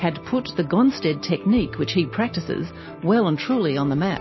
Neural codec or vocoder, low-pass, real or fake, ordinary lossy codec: none; 7.2 kHz; real; MP3, 24 kbps